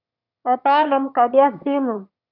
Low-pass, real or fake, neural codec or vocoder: 5.4 kHz; fake; autoencoder, 22.05 kHz, a latent of 192 numbers a frame, VITS, trained on one speaker